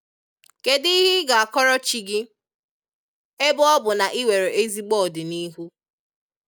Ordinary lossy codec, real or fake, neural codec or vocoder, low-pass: none; real; none; none